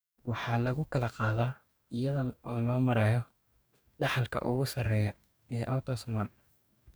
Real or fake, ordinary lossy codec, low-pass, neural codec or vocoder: fake; none; none; codec, 44.1 kHz, 2.6 kbps, DAC